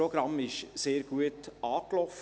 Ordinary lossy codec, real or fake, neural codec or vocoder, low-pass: none; real; none; none